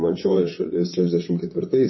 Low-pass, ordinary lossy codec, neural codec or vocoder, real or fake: 7.2 kHz; MP3, 24 kbps; vocoder, 22.05 kHz, 80 mel bands, WaveNeXt; fake